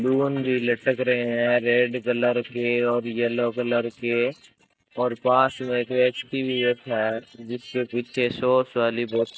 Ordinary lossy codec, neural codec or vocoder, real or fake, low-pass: none; none; real; none